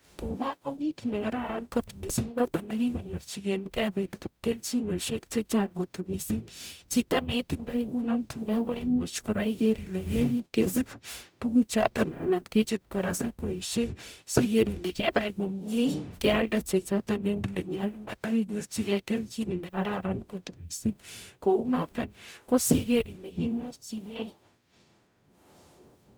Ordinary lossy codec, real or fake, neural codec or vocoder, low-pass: none; fake; codec, 44.1 kHz, 0.9 kbps, DAC; none